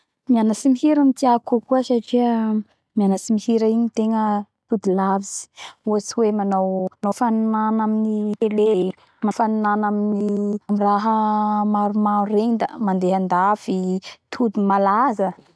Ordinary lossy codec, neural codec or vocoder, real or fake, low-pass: none; none; real; none